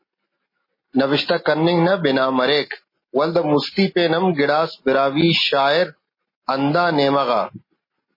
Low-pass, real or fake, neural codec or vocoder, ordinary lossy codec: 5.4 kHz; real; none; MP3, 24 kbps